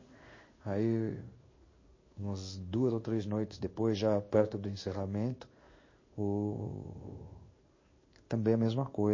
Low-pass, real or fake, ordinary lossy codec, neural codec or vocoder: 7.2 kHz; fake; MP3, 32 kbps; codec, 16 kHz in and 24 kHz out, 1 kbps, XY-Tokenizer